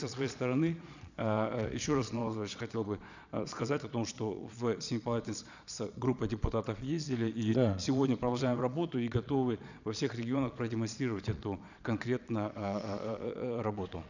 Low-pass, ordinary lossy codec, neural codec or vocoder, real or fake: 7.2 kHz; none; vocoder, 22.05 kHz, 80 mel bands, Vocos; fake